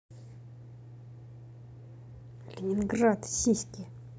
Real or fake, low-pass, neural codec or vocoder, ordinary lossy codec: real; none; none; none